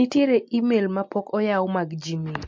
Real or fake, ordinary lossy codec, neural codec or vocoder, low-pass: real; MP3, 48 kbps; none; 7.2 kHz